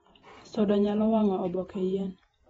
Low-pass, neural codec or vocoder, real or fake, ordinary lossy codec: 19.8 kHz; vocoder, 48 kHz, 128 mel bands, Vocos; fake; AAC, 24 kbps